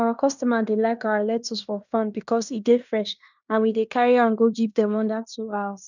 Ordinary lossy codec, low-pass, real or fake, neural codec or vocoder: none; 7.2 kHz; fake; codec, 16 kHz in and 24 kHz out, 0.9 kbps, LongCat-Audio-Codec, fine tuned four codebook decoder